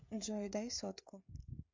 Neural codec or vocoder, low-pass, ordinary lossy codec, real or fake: codec, 16 kHz, 16 kbps, FreqCodec, smaller model; 7.2 kHz; MP3, 64 kbps; fake